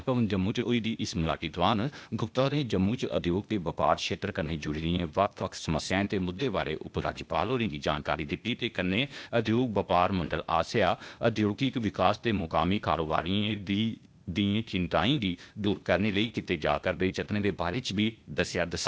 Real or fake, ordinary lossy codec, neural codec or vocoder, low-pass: fake; none; codec, 16 kHz, 0.8 kbps, ZipCodec; none